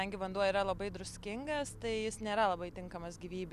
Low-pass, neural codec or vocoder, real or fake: 14.4 kHz; none; real